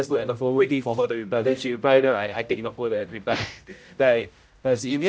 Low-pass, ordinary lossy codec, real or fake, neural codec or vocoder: none; none; fake; codec, 16 kHz, 0.5 kbps, X-Codec, HuBERT features, trained on general audio